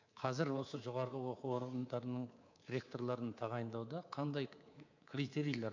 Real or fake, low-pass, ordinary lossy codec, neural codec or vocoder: fake; 7.2 kHz; AAC, 48 kbps; codec, 24 kHz, 3.1 kbps, DualCodec